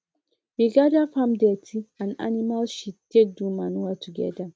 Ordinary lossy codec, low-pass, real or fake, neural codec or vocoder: none; none; real; none